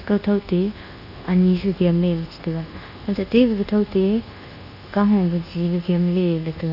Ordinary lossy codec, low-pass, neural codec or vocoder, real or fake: none; 5.4 kHz; codec, 24 kHz, 1.2 kbps, DualCodec; fake